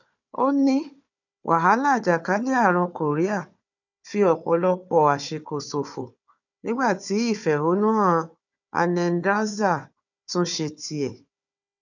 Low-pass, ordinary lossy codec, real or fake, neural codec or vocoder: 7.2 kHz; none; fake; codec, 16 kHz, 4 kbps, FunCodec, trained on Chinese and English, 50 frames a second